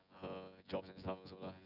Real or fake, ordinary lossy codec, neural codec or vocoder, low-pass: fake; none; vocoder, 24 kHz, 100 mel bands, Vocos; 5.4 kHz